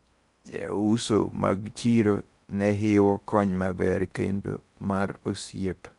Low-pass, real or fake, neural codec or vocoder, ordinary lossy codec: 10.8 kHz; fake; codec, 16 kHz in and 24 kHz out, 0.6 kbps, FocalCodec, streaming, 4096 codes; none